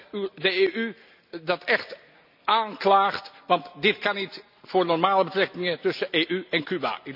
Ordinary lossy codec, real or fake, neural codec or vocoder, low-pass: none; real; none; 5.4 kHz